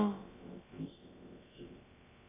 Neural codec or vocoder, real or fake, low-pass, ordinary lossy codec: codec, 16 kHz, about 1 kbps, DyCAST, with the encoder's durations; fake; 3.6 kHz; AAC, 16 kbps